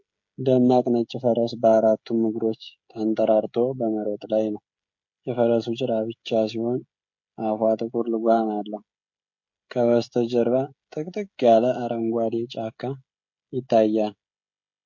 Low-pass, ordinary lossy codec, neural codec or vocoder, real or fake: 7.2 kHz; MP3, 48 kbps; codec, 16 kHz, 16 kbps, FreqCodec, smaller model; fake